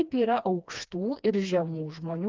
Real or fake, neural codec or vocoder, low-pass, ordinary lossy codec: fake; codec, 16 kHz, 2 kbps, FreqCodec, smaller model; 7.2 kHz; Opus, 24 kbps